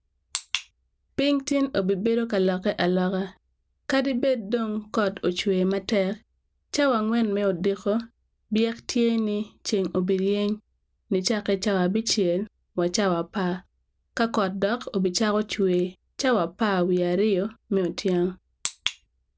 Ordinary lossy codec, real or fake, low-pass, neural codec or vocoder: none; real; none; none